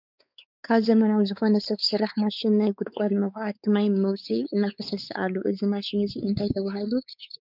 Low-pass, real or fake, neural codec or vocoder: 5.4 kHz; fake; codec, 16 kHz, 4 kbps, X-Codec, WavLM features, trained on Multilingual LibriSpeech